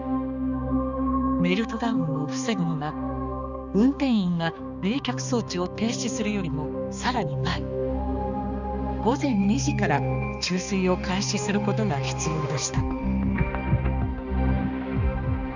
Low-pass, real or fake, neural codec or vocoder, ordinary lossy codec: 7.2 kHz; fake; codec, 16 kHz, 2 kbps, X-Codec, HuBERT features, trained on balanced general audio; none